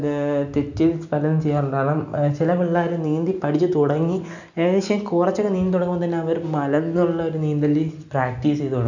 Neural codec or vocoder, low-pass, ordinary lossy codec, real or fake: none; 7.2 kHz; none; real